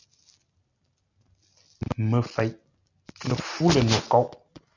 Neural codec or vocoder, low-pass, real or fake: none; 7.2 kHz; real